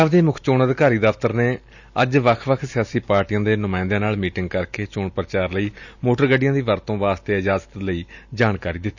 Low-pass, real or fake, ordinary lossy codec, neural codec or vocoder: 7.2 kHz; real; none; none